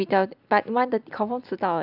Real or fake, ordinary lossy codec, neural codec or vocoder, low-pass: real; none; none; 5.4 kHz